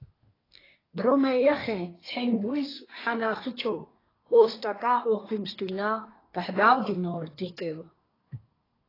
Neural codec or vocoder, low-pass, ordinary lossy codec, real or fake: codec, 24 kHz, 1 kbps, SNAC; 5.4 kHz; AAC, 24 kbps; fake